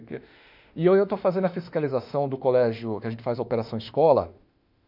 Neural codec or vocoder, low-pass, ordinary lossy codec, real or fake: autoencoder, 48 kHz, 32 numbers a frame, DAC-VAE, trained on Japanese speech; 5.4 kHz; none; fake